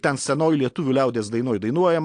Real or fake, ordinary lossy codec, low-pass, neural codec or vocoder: real; AAC, 48 kbps; 9.9 kHz; none